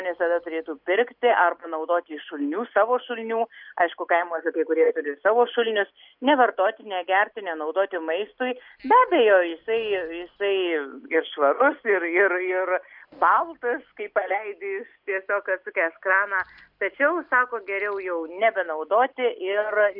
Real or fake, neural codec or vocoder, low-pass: real; none; 5.4 kHz